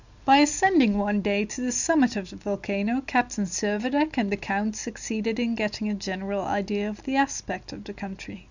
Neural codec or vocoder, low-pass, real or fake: none; 7.2 kHz; real